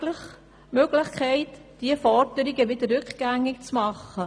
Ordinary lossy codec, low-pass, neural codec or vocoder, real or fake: none; none; none; real